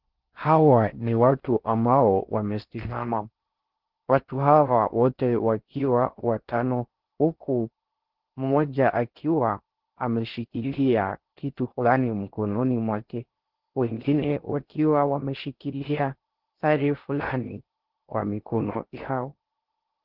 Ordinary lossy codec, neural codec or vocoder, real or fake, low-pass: Opus, 16 kbps; codec, 16 kHz in and 24 kHz out, 0.6 kbps, FocalCodec, streaming, 4096 codes; fake; 5.4 kHz